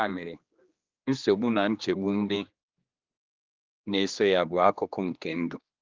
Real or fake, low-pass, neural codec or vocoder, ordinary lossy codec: fake; 7.2 kHz; codec, 16 kHz, 2 kbps, X-Codec, HuBERT features, trained on general audio; Opus, 24 kbps